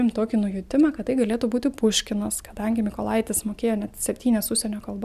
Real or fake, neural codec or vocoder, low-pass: real; none; 14.4 kHz